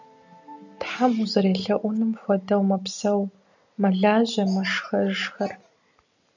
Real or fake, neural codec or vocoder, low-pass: real; none; 7.2 kHz